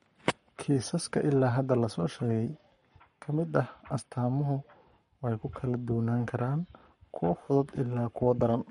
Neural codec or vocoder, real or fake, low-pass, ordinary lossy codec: codec, 44.1 kHz, 7.8 kbps, Pupu-Codec; fake; 19.8 kHz; MP3, 48 kbps